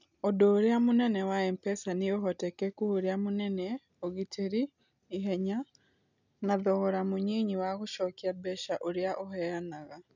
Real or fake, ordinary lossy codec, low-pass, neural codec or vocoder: real; none; 7.2 kHz; none